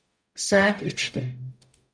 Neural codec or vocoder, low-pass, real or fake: codec, 44.1 kHz, 0.9 kbps, DAC; 9.9 kHz; fake